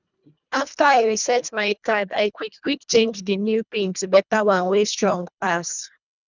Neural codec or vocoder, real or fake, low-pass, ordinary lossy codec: codec, 24 kHz, 1.5 kbps, HILCodec; fake; 7.2 kHz; none